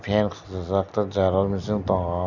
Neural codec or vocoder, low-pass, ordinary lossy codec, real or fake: none; 7.2 kHz; none; real